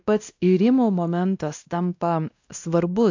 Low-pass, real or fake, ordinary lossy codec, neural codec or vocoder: 7.2 kHz; fake; AAC, 48 kbps; codec, 16 kHz, 1 kbps, X-Codec, WavLM features, trained on Multilingual LibriSpeech